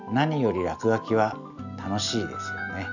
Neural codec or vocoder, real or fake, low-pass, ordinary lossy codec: none; real; 7.2 kHz; none